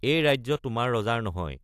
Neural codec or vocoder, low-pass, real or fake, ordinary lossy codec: none; 14.4 kHz; real; AAC, 64 kbps